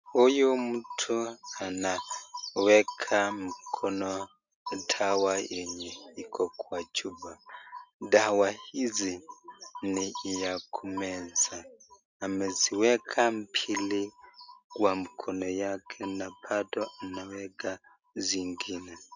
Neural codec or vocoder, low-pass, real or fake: none; 7.2 kHz; real